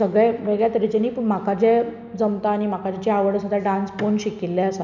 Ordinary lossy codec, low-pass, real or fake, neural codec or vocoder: none; 7.2 kHz; real; none